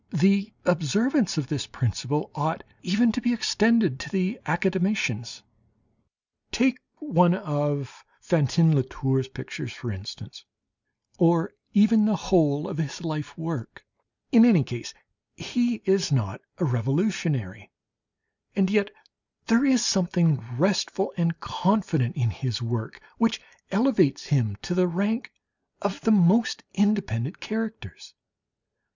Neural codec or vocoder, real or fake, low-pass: none; real; 7.2 kHz